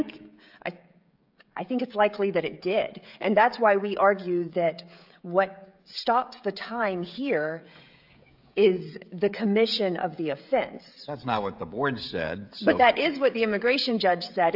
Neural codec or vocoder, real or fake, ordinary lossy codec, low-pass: codec, 16 kHz, 16 kbps, FreqCodec, smaller model; fake; AAC, 48 kbps; 5.4 kHz